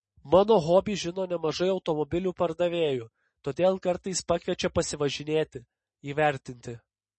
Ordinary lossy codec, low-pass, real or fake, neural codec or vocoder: MP3, 32 kbps; 9.9 kHz; real; none